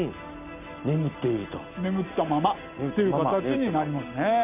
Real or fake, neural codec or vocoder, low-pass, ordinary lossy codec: real; none; 3.6 kHz; none